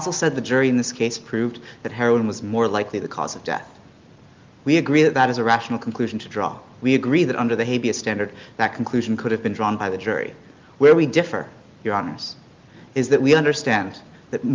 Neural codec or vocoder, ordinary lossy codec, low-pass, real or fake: none; Opus, 32 kbps; 7.2 kHz; real